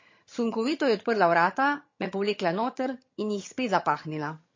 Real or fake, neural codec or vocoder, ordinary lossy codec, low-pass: fake; vocoder, 22.05 kHz, 80 mel bands, HiFi-GAN; MP3, 32 kbps; 7.2 kHz